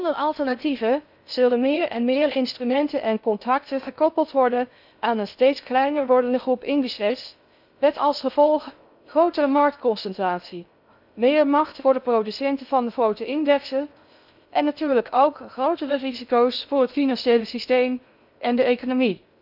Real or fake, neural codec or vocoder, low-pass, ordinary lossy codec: fake; codec, 16 kHz in and 24 kHz out, 0.6 kbps, FocalCodec, streaming, 2048 codes; 5.4 kHz; none